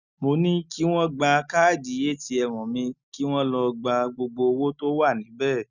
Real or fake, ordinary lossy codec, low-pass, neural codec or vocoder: real; none; 7.2 kHz; none